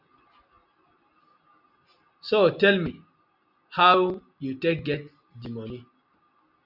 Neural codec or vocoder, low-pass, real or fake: none; 5.4 kHz; real